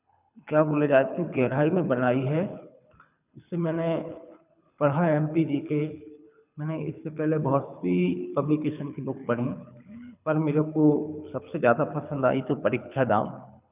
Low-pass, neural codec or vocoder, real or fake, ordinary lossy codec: 3.6 kHz; codec, 24 kHz, 6 kbps, HILCodec; fake; none